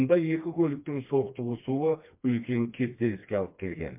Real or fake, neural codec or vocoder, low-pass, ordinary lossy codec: fake; codec, 16 kHz, 2 kbps, FreqCodec, smaller model; 3.6 kHz; MP3, 32 kbps